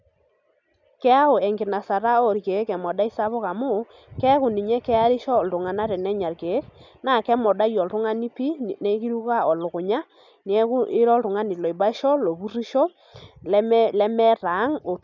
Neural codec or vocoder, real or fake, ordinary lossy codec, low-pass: none; real; none; 7.2 kHz